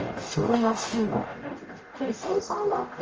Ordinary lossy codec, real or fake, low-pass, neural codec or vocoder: Opus, 24 kbps; fake; 7.2 kHz; codec, 44.1 kHz, 0.9 kbps, DAC